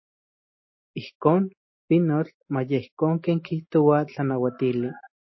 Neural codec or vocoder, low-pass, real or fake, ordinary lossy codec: none; 7.2 kHz; real; MP3, 24 kbps